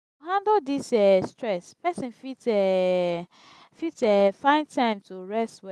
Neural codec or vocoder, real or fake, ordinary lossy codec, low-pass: none; real; none; none